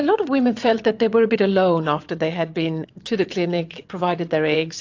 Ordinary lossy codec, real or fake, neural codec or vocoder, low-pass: AAC, 48 kbps; fake; vocoder, 22.05 kHz, 80 mel bands, WaveNeXt; 7.2 kHz